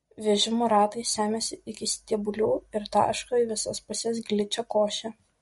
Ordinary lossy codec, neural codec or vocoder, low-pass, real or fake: MP3, 48 kbps; none; 19.8 kHz; real